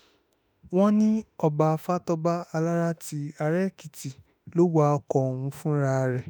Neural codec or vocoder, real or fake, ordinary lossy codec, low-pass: autoencoder, 48 kHz, 32 numbers a frame, DAC-VAE, trained on Japanese speech; fake; none; none